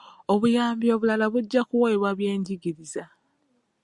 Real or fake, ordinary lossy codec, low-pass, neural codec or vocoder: real; Opus, 64 kbps; 10.8 kHz; none